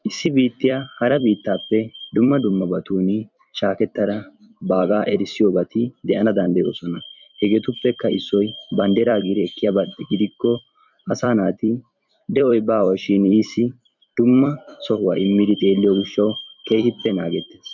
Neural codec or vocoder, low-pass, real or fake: vocoder, 44.1 kHz, 128 mel bands every 256 samples, BigVGAN v2; 7.2 kHz; fake